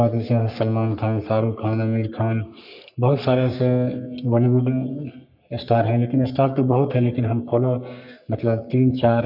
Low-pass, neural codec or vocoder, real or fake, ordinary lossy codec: 5.4 kHz; codec, 44.1 kHz, 3.4 kbps, Pupu-Codec; fake; none